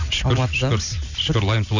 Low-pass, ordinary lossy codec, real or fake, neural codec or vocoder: 7.2 kHz; none; real; none